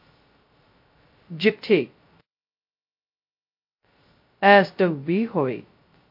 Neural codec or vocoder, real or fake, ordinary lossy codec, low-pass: codec, 16 kHz, 0.2 kbps, FocalCodec; fake; MP3, 32 kbps; 5.4 kHz